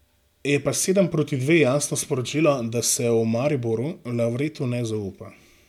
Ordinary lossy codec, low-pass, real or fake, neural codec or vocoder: MP3, 96 kbps; 19.8 kHz; real; none